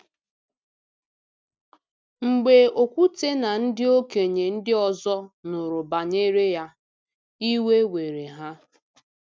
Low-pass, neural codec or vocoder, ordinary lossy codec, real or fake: none; none; none; real